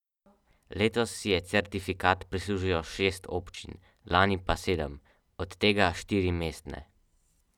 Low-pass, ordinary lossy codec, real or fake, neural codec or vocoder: 19.8 kHz; none; real; none